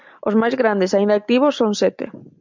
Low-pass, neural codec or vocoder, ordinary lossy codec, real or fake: 7.2 kHz; none; MP3, 64 kbps; real